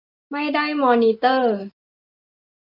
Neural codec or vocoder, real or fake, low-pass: none; real; 5.4 kHz